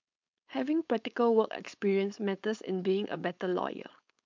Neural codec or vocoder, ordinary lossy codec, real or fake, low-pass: codec, 16 kHz, 4.8 kbps, FACodec; MP3, 64 kbps; fake; 7.2 kHz